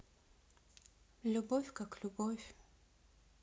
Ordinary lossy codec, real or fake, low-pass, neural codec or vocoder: none; real; none; none